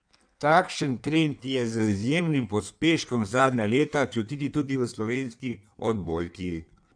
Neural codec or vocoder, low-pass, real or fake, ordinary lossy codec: codec, 16 kHz in and 24 kHz out, 1.1 kbps, FireRedTTS-2 codec; 9.9 kHz; fake; none